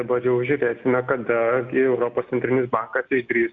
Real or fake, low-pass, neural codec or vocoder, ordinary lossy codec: real; 7.2 kHz; none; AAC, 64 kbps